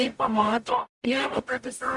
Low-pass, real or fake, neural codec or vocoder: 10.8 kHz; fake; codec, 44.1 kHz, 0.9 kbps, DAC